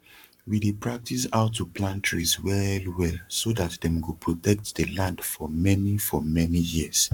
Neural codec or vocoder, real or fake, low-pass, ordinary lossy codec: codec, 44.1 kHz, 7.8 kbps, Pupu-Codec; fake; 19.8 kHz; none